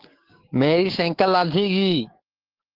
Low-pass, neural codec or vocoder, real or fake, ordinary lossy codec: 5.4 kHz; codec, 16 kHz, 6 kbps, DAC; fake; Opus, 16 kbps